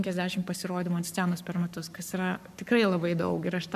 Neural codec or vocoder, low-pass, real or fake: codec, 44.1 kHz, 7.8 kbps, Pupu-Codec; 14.4 kHz; fake